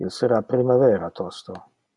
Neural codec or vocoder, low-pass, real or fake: none; 10.8 kHz; real